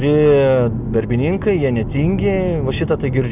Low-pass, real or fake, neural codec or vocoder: 3.6 kHz; real; none